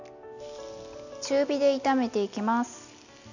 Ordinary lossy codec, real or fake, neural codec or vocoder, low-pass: none; real; none; 7.2 kHz